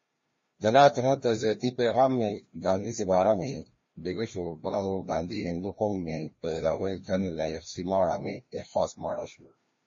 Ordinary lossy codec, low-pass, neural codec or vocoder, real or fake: MP3, 32 kbps; 7.2 kHz; codec, 16 kHz, 1 kbps, FreqCodec, larger model; fake